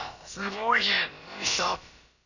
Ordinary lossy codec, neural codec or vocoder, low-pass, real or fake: none; codec, 16 kHz, about 1 kbps, DyCAST, with the encoder's durations; 7.2 kHz; fake